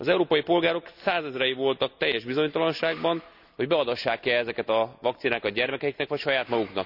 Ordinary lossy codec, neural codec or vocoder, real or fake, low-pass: none; none; real; 5.4 kHz